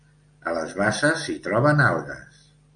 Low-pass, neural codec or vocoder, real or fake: 9.9 kHz; none; real